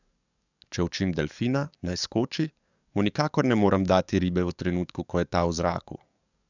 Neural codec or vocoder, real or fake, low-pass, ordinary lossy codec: codec, 44.1 kHz, 7.8 kbps, DAC; fake; 7.2 kHz; none